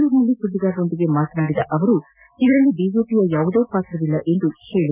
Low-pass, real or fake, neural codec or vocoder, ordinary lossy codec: 3.6 kHz; real; none; none